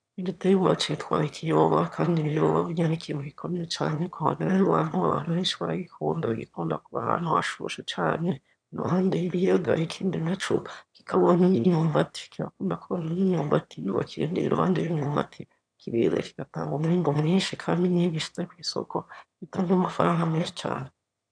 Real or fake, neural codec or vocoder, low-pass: fake; autoencoder, 22.05 kHz, a latent of 192 numbers a frame, VITS, trained on one speaker; 9.9 kHz